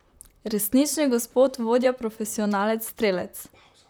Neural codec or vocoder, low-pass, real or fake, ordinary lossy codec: vocoder, 44.1 kHz, 128 mel bands, Pupu-Vocoder; none; fake; none